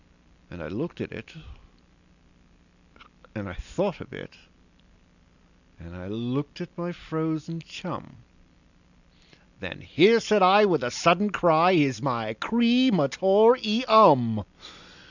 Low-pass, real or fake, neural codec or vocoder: 7.2 kHz; real; none